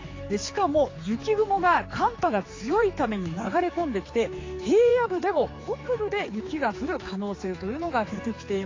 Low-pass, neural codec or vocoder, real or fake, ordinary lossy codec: 7.2 kHz; codec, 16 kHz, 4 kbps, X-Codec, HuBERT features, trained on general audio; fake; AAC, 32 kbps